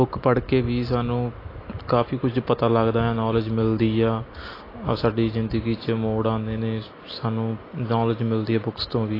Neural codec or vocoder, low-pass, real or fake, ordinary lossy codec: none; 5.4 kHz; real; AAC, 24 kbps